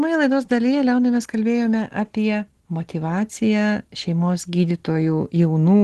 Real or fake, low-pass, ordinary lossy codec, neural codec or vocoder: real; 10.8 kHz; Opus, 16 kbps; none